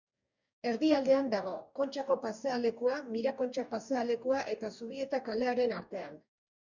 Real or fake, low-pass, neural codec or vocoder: fake; 7.2 kHz; codec, 44.1 kHz, 2.6 kbps, DAC